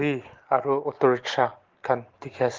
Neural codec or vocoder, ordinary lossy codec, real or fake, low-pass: none; Opus, 16 kbps; real; 7.2 kHz